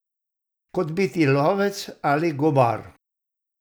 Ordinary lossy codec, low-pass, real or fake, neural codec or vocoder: none; none; real; none